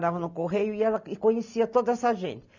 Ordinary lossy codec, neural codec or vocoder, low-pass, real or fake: none; none; 7.2 kHz; real